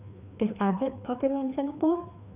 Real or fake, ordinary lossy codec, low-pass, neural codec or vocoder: fake; none; 3.6 kHz; codec, 16 kHz, 2 kbps, FreqCodec, larger model